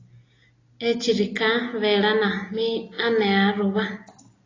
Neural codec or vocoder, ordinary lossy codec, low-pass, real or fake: none; AAC, 32 kbps; 7.2 kHz; real